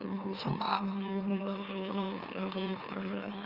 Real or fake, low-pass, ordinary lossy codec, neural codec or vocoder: fake; 5.4 kHz; Opus, 32 kbps; autoencoder, 44.1 kHz, a latent of 192 numbers a frame, MeloTTS